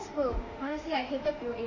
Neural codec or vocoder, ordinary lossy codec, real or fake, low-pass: autoencoder, 48 kHz, 32 numbers a frame, DAC-VAE, trained on Japanese speech; Opus, 64 kbps; fake; 7.2 kHz